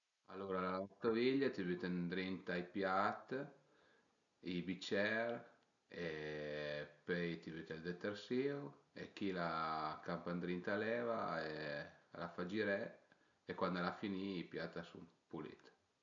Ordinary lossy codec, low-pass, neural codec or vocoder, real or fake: none; 7.2 kHz; none; real